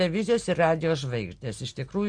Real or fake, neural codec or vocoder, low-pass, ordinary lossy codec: real; none; 9.9 kHz; MP3, 64 kbps